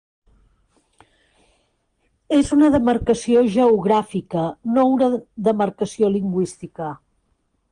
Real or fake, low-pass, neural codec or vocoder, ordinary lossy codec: real; 9.9 kHz; none; Opus, 24 kbps